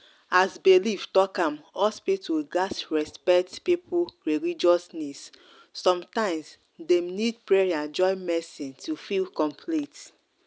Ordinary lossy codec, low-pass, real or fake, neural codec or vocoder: none; none; real; none